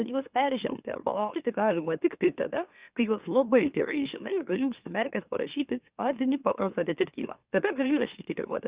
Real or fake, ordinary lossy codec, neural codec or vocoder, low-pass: fake; Opus, 64 kbps; autoencoder, 44.1 kHz, a latent of 192 numbers a frame, MeloTTS; 3.6 kHz